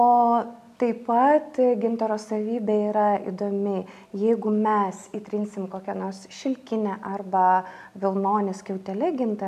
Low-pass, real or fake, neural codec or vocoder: 14.4 kHz; real; none